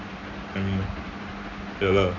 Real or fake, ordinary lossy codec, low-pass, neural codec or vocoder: real; none; 7.2 kHz; none